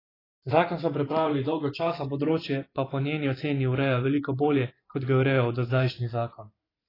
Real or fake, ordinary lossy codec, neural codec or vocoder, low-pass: fake; AAC, 24 kbps; vocoder, 44.1 kHz, 128 mel bands every 512 samples, BigVGAN v2; 5.4 kHz